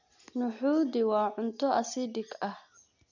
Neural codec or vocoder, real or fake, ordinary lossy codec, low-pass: none; real; none; 7.2 kHz